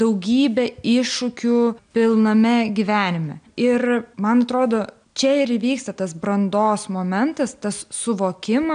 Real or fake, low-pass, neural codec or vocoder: real; 9.9 kHz; none